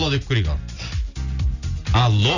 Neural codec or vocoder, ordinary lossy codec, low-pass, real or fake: none; Opus, 64 kbps; 7.2 kHz; real